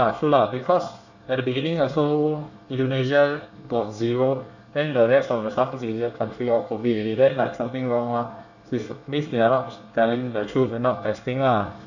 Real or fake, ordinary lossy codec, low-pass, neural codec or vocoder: fake; none; 7.2 kHz; codec, 24 kHz, 1 kbps, SNAC